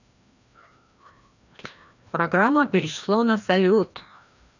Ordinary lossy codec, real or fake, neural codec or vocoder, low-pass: none; fake; codec, 16 kHz, 1 kbps, FreqCodec, larger model; 7.2 kHz